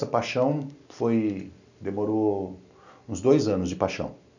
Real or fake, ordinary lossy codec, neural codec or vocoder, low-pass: real; none; none; 7.2 kHz